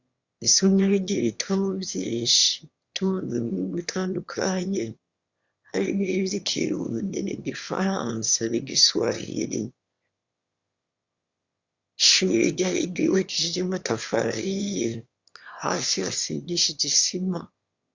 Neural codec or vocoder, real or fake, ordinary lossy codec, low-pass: autoencoder, 22.05 kHz, a latent of 192 numbers a frame, VITS, trained on one speaker; fake; Opus, 64 kbps; 7.2 kHz